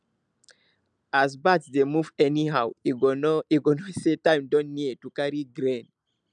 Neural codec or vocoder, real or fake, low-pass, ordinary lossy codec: none; real; 9.9 kHz; none